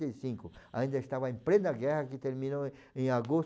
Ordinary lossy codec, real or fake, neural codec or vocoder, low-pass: none; real; none; none